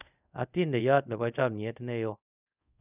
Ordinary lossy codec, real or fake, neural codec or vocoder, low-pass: none; fake; codec, 24 kHz, 0.5 kbps, DualCodec; 3.6 kHz